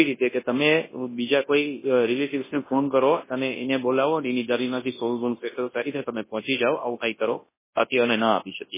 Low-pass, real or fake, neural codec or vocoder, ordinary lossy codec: 3.6 kHz; fake; codec, 24 kHz, 0.9 kbps, WavTokenizer, large speech release; MP3, 16 kbps